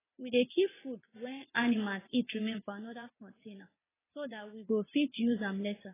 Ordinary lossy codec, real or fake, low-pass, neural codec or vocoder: AAC, 16 kbps; fake; 3.6 kHz; vocoder, 22.05 kHz, 80 mel bands, WaveNeXt